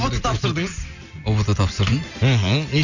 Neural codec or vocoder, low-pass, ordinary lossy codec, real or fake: none; 7.2 kHz; none; real